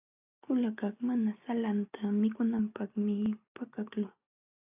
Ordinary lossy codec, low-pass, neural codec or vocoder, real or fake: AAC, 32 kbps; 3.6 kHz; none; real